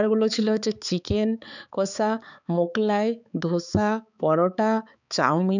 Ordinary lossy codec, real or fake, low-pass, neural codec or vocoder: none; fake; 7.2 kHz; codec, 16 kHz, 4 kbps, X-Codec, HuBERT features, trained on balanced general audio